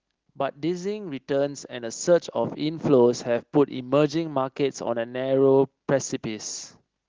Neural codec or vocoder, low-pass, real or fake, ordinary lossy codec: none; 7.2 kHz; real; Opus, 16 kbps